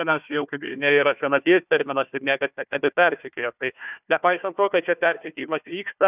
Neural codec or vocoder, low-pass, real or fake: codec, 16 kHz, 1 kbps, FunCodec, trained on Chinese and English, 50 frames a second; 3.6 kHz; fake